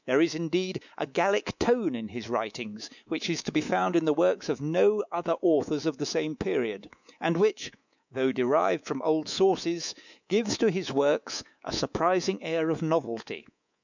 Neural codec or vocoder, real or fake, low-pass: codec, 24 kHz, 3.1 kbps, DualCodec; fake; 7.2 kHz